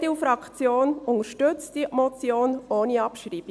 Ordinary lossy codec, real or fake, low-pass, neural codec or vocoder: none; real; none; none